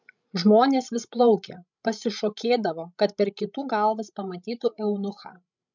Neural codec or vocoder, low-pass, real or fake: codec, 16 kHz, 16 kbps, FreqCodec, larger model; 7.2 kHz; fake